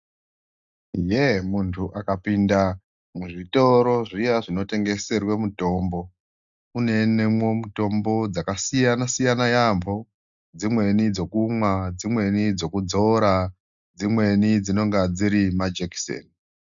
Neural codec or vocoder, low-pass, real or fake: none; 7.2 kHz; real